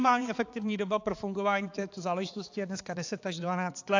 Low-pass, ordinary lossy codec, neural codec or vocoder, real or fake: 7.2 kHz; AAC, 48 kbps; codec, 16 kHz, 4 kbps, X-Codec, HuBERT features, trained on balanced general audio; fake